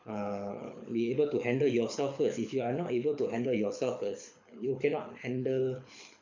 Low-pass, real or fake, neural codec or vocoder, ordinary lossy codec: 7.2 kHz; fake; codec, 24 kHz, 6 kbps, HILCodec; MP3, 64 kbps